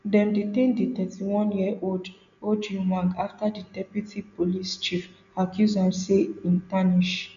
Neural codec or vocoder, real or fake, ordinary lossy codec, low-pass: none; real; none; 7.2 kHz